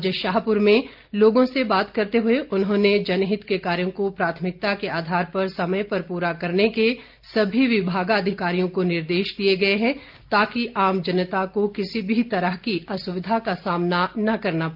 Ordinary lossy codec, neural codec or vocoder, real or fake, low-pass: Opus, 24 kbps; none; real; 5.4 kHz